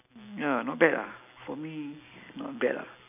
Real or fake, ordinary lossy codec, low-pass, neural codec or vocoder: real; none; 3.6 kHz; none